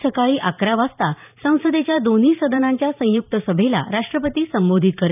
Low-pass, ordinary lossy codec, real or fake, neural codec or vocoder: 3.6 kHz; none; fake; vocoder, 44.1 kHz, 128 mel bands every 512 samples, BigVGAN v2